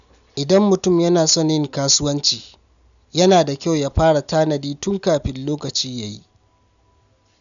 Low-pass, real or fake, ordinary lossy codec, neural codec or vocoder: 7.2 kHz; real; none; none